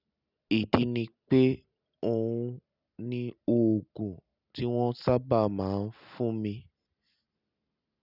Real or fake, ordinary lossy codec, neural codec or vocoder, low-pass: real; none; none; 5.4 kHz